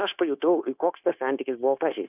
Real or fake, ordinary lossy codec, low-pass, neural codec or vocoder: fake; AAC, 32 kbps; 3.6 kHz; codec, 16 kHz, 0.9 kbps, LongCat-Audio-Codec